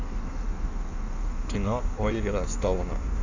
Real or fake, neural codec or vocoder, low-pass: fake; codec, 16 kHz in and 24 kHz out, 1.1 kbps, FireRedTTS-2 codec; 7.2 kHz